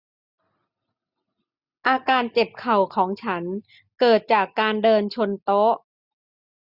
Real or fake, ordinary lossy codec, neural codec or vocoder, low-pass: real; Opus, 64 kbps; none; 5.4 kHz